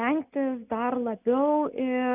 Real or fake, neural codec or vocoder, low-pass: real; none; 3.6 kHz